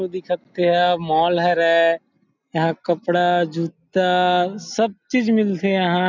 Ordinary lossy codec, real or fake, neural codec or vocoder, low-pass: none; real; none; 7.2 kHz